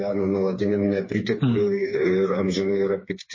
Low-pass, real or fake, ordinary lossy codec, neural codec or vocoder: 7.2 kHz; fake; MP3, 32 kbps; codec, 16 kHz, 4 kbps, FreqCodec, smaller model